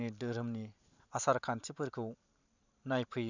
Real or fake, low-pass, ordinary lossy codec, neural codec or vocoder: fake; 7.2 kHz; none; vocoder, 44.1 kHz, 128 mel bands every 512 samples, BigVGAN v2